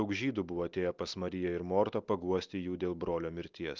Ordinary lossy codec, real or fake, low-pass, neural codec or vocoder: Opus, 24 kbps; real; 7.2 kHz; none